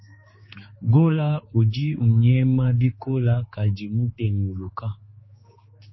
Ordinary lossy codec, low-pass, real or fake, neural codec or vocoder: MP3, 24 kbps; 7.2 kHz; fake; codec, 16 kHz, 4 kbps, X-Codec, HuBERT features, trained on general audio